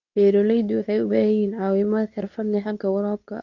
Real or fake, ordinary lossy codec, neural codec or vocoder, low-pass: fake; none; codec, 24 kHz, 0.9 kbps, WavTokenizer, medium speech release version 2; 7.2 kHz